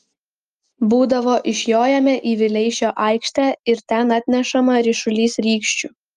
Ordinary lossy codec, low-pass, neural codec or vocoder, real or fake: Opus, 32 kbps; 14.4 kHz; none; real